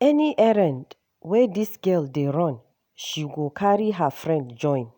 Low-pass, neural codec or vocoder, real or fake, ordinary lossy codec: none; none; real; none